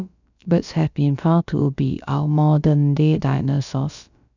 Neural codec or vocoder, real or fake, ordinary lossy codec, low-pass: codec, 16 kHz, about 1 kbps, DyCAST, with the encoder's durations; fake; none; 7.2 kHz